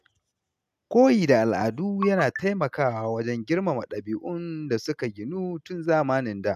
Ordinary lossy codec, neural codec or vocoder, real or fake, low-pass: MP3, 96 kbps; none; real; 14.4 kHz